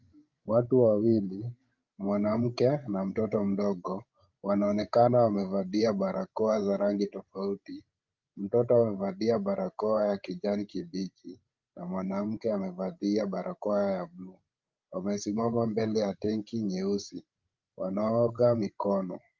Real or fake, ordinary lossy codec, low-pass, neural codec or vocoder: fake; Opus, 32 kbps; 7.2 kHz; codec, 16 kHz, 16 kbps, FreqCodec, larger model